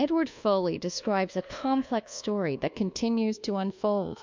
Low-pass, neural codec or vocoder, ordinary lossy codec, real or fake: 7.2 kHz; codec, 24 kHz, 1.2 kbps, DualCodec; MP3, 64 kbps; fake